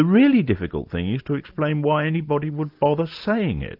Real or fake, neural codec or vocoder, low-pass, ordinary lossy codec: real; none; 5.4 kHz; Opus, 32 kbps